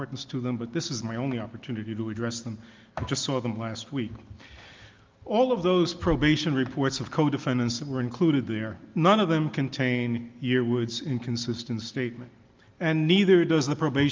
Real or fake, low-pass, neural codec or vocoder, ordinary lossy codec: real; 7.2 kHz; none; Opus, 32 kbps